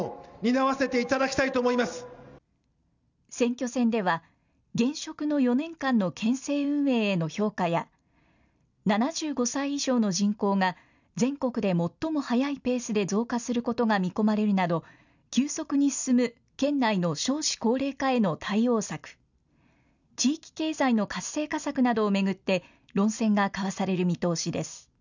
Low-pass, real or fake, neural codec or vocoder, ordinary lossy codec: 7.2 kHz; real; none; none